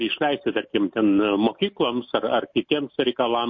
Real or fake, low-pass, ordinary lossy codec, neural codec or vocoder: real; 7.2 kHz; MP3, 32 kbps; none